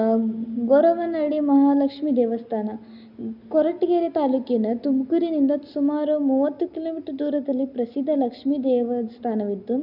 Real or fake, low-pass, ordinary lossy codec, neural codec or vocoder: real; 5.4 kHz; none; none